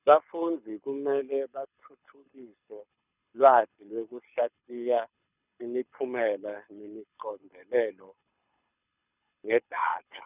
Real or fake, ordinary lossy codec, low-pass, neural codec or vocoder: fake; none; 3.6 kHz; codec, 24 kHz, 6 kbps, HILCodec